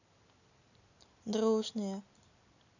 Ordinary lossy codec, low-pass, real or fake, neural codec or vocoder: none; 7.2 kHz; real; none